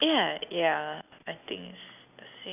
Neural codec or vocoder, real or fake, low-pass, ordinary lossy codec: none; real; 3.6 kHz; none